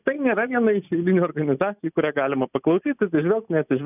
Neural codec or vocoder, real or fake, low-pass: none; real; 3.6 kHz